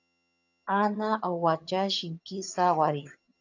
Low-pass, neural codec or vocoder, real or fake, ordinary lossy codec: 7.2 kHz; vocoder, 22.05 kHz, 80 mel bands, HiFi-GAN; fake; AAC, 48 kbps